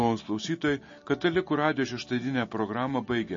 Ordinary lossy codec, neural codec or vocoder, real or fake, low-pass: MP3, 32 kbps; none; real; 7.2 kHz